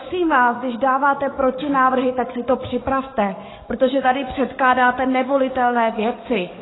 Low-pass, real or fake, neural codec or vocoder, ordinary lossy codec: 7.2 kHz; fake; codec, 44.1 kHz, 7.8 kbps, DAC; AAC, 16 kbps